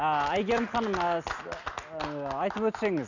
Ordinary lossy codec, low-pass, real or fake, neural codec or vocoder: none; 7.2 kHz; real; none